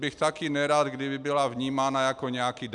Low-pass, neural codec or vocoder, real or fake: 10.8 kHz; none; real